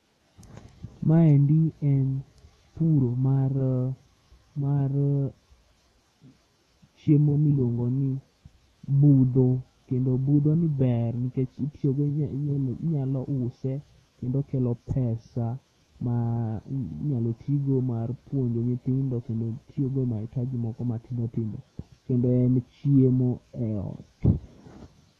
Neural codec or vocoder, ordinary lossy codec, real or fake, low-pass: autoencoder, 48 kHz, 128 numbers a frame, DAC-VAE, trained on Japanese speech; AAC, 32 kbps; fake; 19.8 kHz